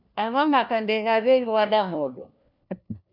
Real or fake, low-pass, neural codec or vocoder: fake; 5.4 kHz; codec, 16 kHz, 1 kbps, FunCodec, trained on LibriTTS, 50 frames a second